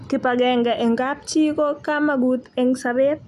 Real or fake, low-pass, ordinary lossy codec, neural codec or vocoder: real; 14.4 kHz; none; none